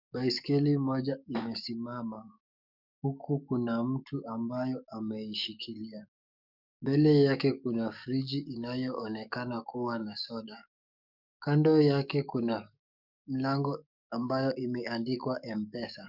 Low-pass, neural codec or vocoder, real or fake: 5.4 kHz; codec, 44.1 kHz, 7.8 kbps, DAC; fake